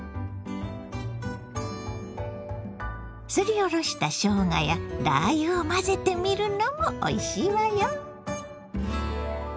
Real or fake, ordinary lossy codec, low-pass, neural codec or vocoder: real; none; none; none